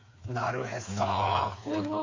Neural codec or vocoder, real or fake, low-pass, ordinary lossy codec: codec, 16 kHz, 4 kbps, FreqCodec, smaller model; fake; 7.2 kHz; MP3, 32 kbps